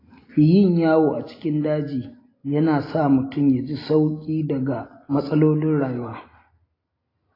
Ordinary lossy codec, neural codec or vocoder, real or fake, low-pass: AAC, 24 kbps; none; real; 5.4 kHz